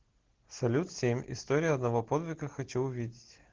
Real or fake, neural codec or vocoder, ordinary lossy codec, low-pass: real; none; Opus, 16 kbps; 7.2 kHz